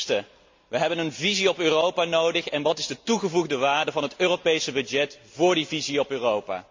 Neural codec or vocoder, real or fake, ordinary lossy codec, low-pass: none; real; MP3, 48 kbps; 7.2 kHz